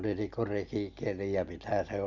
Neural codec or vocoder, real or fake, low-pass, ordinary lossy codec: none; real; 7.2 kHz; none